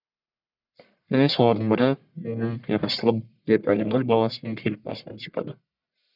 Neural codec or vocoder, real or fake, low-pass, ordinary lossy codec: codec, 44.1 kHz, 1.7 kbps, Pupu-Codec; fake; 5.4 kHz; none